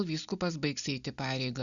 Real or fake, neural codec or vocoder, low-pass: real; none; 7.2 kHz